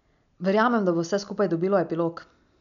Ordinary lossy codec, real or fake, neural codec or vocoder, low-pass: none; real; none; 7.2 kHz